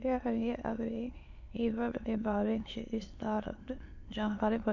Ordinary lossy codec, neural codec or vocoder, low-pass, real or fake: AAC, 48 kbps; autoencoder, 22.05 kHz, a latent of 192 numbers a frame, VITS, trained on many speakers; 7.2 kHz; fake